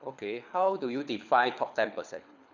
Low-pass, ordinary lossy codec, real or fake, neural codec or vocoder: 7.2 kHz; none; fake; codec, 24 kHz, 6 kbps, HILCodec